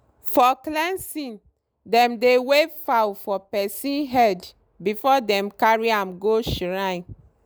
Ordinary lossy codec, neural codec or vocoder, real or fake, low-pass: none; none; real; none